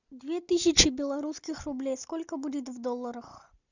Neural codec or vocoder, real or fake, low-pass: none; real; 7.2 kHz